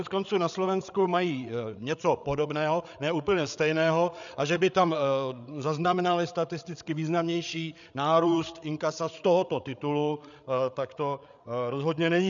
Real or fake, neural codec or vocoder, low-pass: fake; codec, 16 kHz, 8 kbps, FreqCodec, larger model; 7.2 kHz